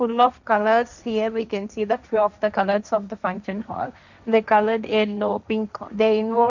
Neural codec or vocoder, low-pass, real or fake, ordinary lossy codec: codec, 16 kHz, 1.1 kbps, Voila-Tokenizer; 7.2 kHz; fake; none